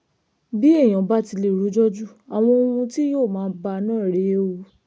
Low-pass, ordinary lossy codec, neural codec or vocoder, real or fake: none; none; none; real